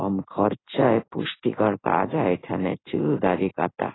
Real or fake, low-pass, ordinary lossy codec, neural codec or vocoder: fake; 7.2 kHz; AAC, 16 kbps; codec, 16 kHz in and 24 kHz out, 1 kbps, XY-Tokenizer